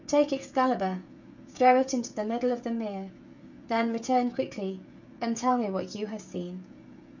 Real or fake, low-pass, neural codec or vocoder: fake; 7.2 kHz; codec, 16 kHz, 16 kbps, FreqCodec, smaller model